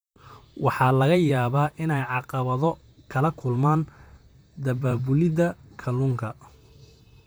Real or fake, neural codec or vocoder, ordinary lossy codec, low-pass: fake; vocoder, 44.1 kHz, 128 mel bands, Pupu-Vocoder; none; none